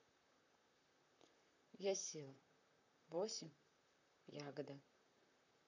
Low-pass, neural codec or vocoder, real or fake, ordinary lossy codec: 7.2 kHz; vocoder, 44.1 kHz, 128 mel bands, Pupu-Vocoder; fake; none